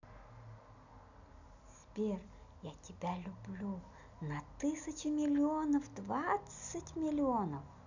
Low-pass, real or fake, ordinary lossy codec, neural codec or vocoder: 7.2 kHz; real; none; none